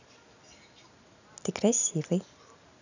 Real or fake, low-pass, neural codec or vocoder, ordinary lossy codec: real; 7.2 kHz; none; none